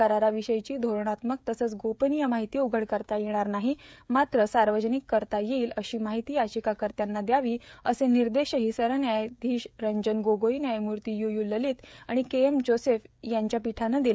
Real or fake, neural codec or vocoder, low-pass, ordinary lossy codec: fake; codec, 16 kHz, 16 kbps, FreqCodec, smaller model; none; none